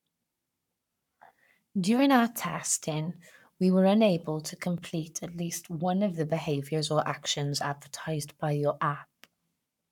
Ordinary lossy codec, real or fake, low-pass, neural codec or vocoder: none; fake; 19.8 kHz; codec, 44.1 kHz, 7.8 kbps, Pupu-Codec